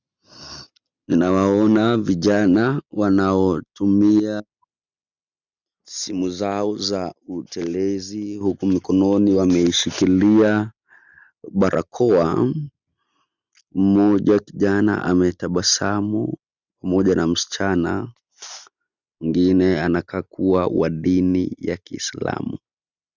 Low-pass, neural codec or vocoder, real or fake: 7.2 kHz; none; real